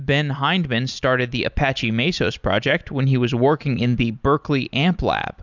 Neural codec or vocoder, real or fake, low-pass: none; real; 7.2 kHz